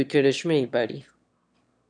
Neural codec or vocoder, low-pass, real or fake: autoencoder, 22.05 kHz, a latent of 192 numbers a frame, VITS, trained on one speaker; 9.9 kHz; fake